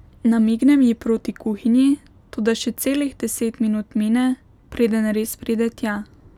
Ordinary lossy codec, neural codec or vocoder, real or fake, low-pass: none; none; real; 19.8 kHz